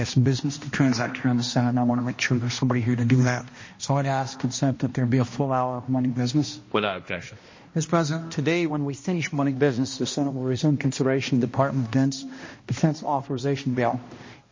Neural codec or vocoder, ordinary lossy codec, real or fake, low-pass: codec, 16 kHz, 1 kbps, X-Codec, HuBERT features, trained on balanced general audio; MP3, 32 kbps; fake; 7.2 kHz